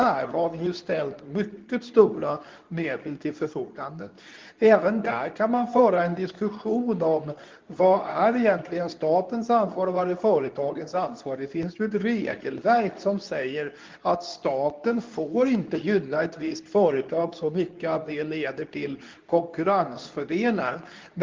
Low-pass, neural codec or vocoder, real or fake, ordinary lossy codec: 7.2 kHz; codec, 24 kHz, 0.9 kbps, WavTokenizer, medium speech release version 2; fake; Opus, 32 kbps